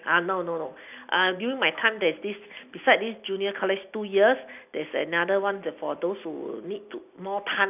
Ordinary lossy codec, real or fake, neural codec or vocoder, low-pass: none; real; none; 3.6 kHz